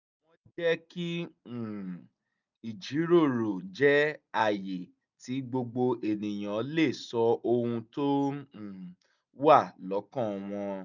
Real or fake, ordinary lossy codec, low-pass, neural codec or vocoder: real; none; 7.2 kHz; none